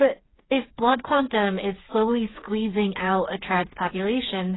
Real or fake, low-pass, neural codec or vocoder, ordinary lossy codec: fake; 7.2 kHz; codec, 16 kHz, 4 kbps, FreqCodec, smaller model; AAC, 16 kbps